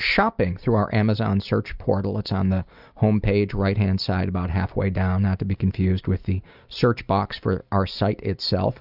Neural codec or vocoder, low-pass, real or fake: none; 5.4 kHz; real